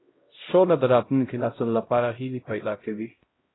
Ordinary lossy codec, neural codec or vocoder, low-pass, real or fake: AAC, 16 kbps; codec, 16 kHz, 0.5 kbps, X-Codec, HuBERT features, trained on LibriSpeech; 7.2 kHz; fake